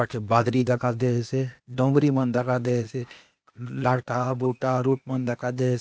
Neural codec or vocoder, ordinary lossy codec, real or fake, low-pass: codec, 16 kHz, 0.8 kbps, ZipCodec; none; fake; none